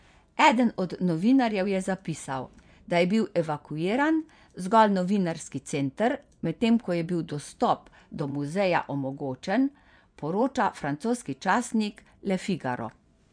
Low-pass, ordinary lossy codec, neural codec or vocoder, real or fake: 9.9 kHz; none; vocoder, 24 kHz, 100 mel bands, Vocos; fake